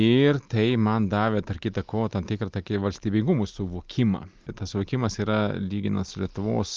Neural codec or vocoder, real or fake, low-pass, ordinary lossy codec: none; real; 7.2 kHz; Opus, 32 kbps